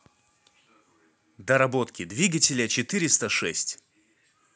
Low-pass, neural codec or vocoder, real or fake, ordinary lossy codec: none; none; real; none